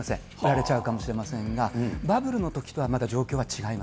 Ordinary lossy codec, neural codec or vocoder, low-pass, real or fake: none; none; none; real